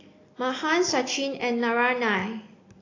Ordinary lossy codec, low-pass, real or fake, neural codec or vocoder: AAC, 32 kbps; 7.2 kHz; real; none